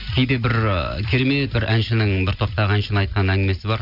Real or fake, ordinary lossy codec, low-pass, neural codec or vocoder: real; none; 5.4 kHz; none